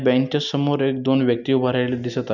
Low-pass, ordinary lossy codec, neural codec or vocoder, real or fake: 7.2 kHz; none; none; real